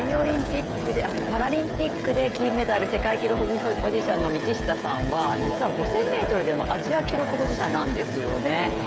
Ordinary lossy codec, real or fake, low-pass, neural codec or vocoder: none; fake; none; codec, 16 kHz, 8 kbps, FreqCodec, smaller model